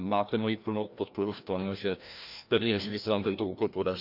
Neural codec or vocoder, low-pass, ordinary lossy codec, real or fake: codec, 16 kHz, 1 kbps, FreqCodec, larger model; 5.4 kHz; none; fake